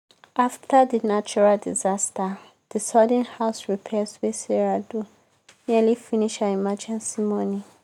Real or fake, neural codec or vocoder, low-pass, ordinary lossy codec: real; none; 19.8 kHz; none